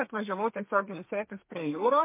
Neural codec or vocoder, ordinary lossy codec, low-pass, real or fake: codec, 32 kHz, 1.9 kbps, SNAC; MP3, 24 kbps; 3.6 kHz; fake